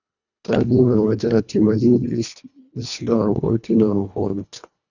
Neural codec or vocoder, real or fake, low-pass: codec, 24 kHz, 1.5 kbps, HILCodec; fake; 7.2 kHz